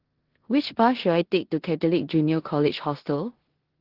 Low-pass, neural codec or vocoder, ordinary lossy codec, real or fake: 5.4 kHz; codec, 16 kHz in and 24 kHz out, 0.9 kbps, LongCat-Audio-Codec, four codebook decoder; Opus, 16 kbps; fake